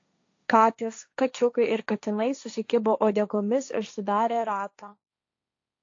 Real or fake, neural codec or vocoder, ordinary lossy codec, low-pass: fake; codec, 16 kHz, 1.1 kbps, Voila-Tokenizer; AAC, 48 kbps; 7.2 kHz